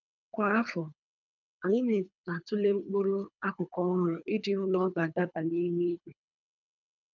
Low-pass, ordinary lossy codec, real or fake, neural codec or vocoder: 7.2 kHz; none; fake; codec, 24 kHz, 3 kbps, HILCodec